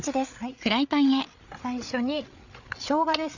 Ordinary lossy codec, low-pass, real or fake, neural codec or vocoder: none; 7.2 kHz; fake; codec, 16 kHz, 8 kbps, FreqCodec, larger model